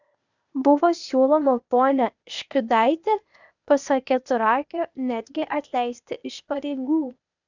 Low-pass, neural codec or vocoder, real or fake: 7.2 kHz; codec, 16 kHz, 0.8 kbps, ZipCodec; fake